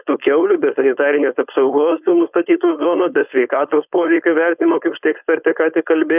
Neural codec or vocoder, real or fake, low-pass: codec, 16 kHz, 4.8 kbps, FACodec; fake; 3.6 kHz